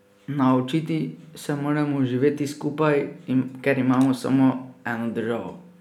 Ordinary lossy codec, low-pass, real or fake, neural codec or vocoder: none; 19.8 kHz; real; none